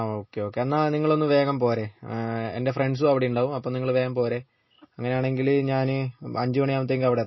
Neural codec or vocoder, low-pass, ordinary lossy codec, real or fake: none; 7.2 kHz; MP3, 24 kbps; real